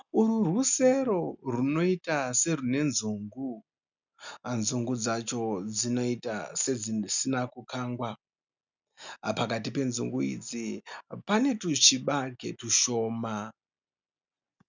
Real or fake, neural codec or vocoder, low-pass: real; none; 7.2 kHz